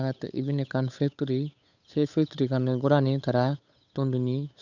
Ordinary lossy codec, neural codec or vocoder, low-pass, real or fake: none; codec, 16 kHz, 8 kbps, FunCodec, trained on Chinese and English, 25 frames a second; 7.2 kHz; fake